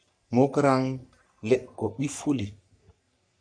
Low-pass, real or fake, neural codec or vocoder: 9.9 kHz; fake; codec, 44.1 kHz, 3.4 kbps, Pupu-Codec